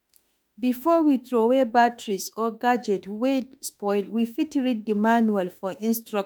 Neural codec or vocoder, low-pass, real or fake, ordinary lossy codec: autoencoder, 48 kHz, 32 numbers a frame, DAC-VAE, trained on Japanese speech; none; fake; none